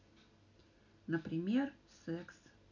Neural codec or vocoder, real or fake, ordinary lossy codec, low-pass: none; real; none; 7.2 kHz